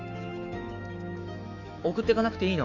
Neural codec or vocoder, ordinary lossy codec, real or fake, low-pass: autoencoder, 48 kHz, 128 numbers a frame, DAC-VAE, trained on Japanese speech; none; fake; 7.2 kHz